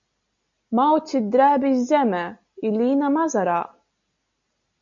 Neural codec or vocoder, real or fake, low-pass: none; real; 7.2 kHz